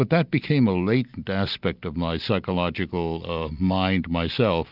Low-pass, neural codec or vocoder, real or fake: 5.4 kHz; none; real